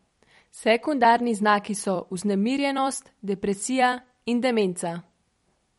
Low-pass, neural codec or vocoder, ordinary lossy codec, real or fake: 19.8 kHz; vocoder, 44.1 kHz, 128 mel bands every 256 samples, BigVGAN v2; MP3, 48 kbps; fake